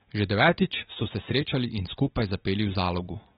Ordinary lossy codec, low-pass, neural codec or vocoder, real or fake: AAC, 16 kbps; 7.2 kHz; none; real